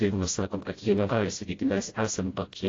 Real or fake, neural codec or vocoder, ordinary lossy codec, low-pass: fake; codec, 16 kHz, 0.5 kbps, FreqCodec, smaller model; AAC, 32 kbps; 7.2 kHz